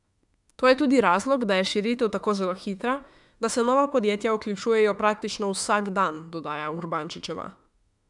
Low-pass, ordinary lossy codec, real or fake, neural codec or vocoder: 10.8 kHz; none; fake; autoencoder, 48 kHz, 32 numbers a frame, DAC-VAE, trained on Japanese speech